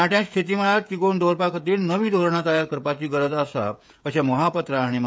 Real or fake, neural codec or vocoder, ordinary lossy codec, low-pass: fake; codec, 16 kHz, 16 kbps, FreqCodec, smaller model; none; none